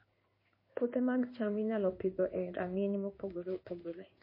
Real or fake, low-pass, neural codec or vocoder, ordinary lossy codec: fake; 5.4 kHz; codec, 16 kHz in and 24 kHz out, 1 kbps, XY-Tokenizer; MP3, 24 kbps